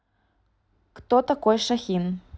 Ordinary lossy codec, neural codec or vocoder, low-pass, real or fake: none; none; none; real